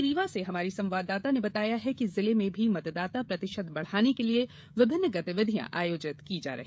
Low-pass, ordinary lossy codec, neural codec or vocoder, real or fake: none; none; codec, 16 kHz, 16 kbps, FreqCodec, smaller model; fake